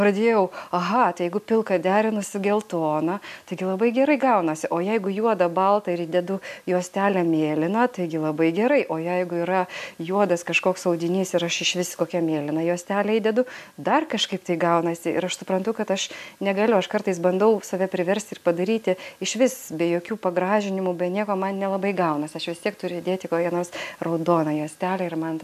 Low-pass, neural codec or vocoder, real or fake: 14.4 kHz; none; real